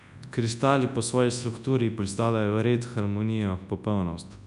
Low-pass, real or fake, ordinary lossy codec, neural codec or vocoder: 10.8 kHz; fake; none; codec, 24 kHz, 0.9 kbps, WavTokenizer, large speech release